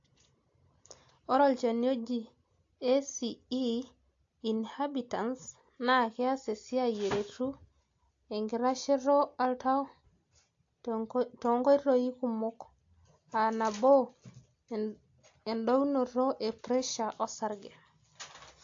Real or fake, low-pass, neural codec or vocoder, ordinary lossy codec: real; 7.2 kHz; none; AAC, 48 kbps